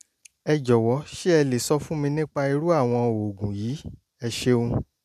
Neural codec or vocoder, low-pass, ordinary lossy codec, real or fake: none; 14.4 kHz; none; real